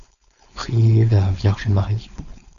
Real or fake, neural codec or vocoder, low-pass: fake; codec, 16 kHz, 4.8 kbps, FACodec; 7.2 kHz